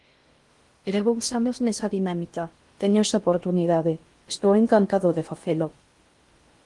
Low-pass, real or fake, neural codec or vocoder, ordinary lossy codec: 10.8 kHz; fake; codec, 16 kHz in and 24 kHz out, 0.6 kbps, FocalCodec, streaming, 4096 codes; Opus, 24 kbps